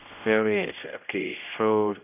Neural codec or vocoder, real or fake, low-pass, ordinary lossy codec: codec, 16 kHz, 0.5 kbps, X-Codec, HuBERT features, trained on general audio; fake; 3.6 kHz; none